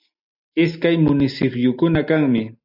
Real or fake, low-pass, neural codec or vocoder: real; 5.4 kHz; none